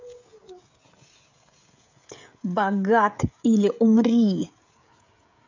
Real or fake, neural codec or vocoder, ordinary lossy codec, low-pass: fake; codec, 16 kHz, 16 kbps, FreqCodec, smaller model; MP3, 48 kbps; 7.2 kHz